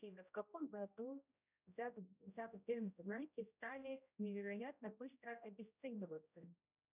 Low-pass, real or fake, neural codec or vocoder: 3.6 kHz; fake; codec, 16 kHz, 0.5 kbps, X-Codec, HuBERT features, trained on general audio